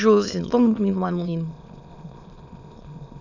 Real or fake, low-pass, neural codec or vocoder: fake; 7.2 kHz; autoencoder, 22.05 kHz, a latent of 192 numbers a frame, VITS, trained on many speakers